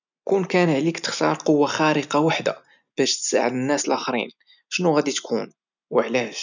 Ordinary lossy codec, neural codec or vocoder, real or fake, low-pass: none; none; real; 7.2 kHz